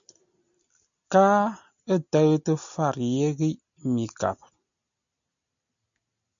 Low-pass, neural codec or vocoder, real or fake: 7.2 kHz; none; real